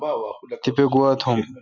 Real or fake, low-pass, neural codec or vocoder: real; 7.2 kHz; none